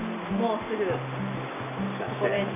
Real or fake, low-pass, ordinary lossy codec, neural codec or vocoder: real; 3.6 kHz; none; none